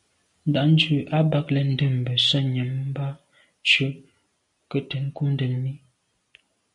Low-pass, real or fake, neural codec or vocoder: 10.8 kHz; real; none